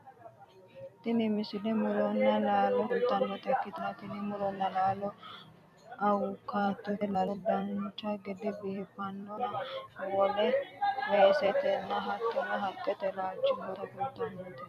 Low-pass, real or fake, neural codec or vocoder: 14.4 kHz; fake; vocoder, 44.1 kHz, 128 mel bands every 256 samples, BigVGAN v2